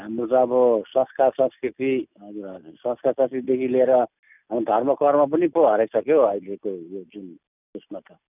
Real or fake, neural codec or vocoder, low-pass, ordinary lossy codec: real; none; 3.6 kHz; none